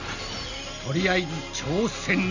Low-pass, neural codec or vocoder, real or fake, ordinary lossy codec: 7.2 kHz; none; real; none